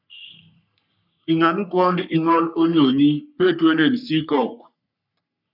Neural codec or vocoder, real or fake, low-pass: codec, 44.1 kHz, 3.4 kbps, Pupu-Codec; fake; 5.4 kHz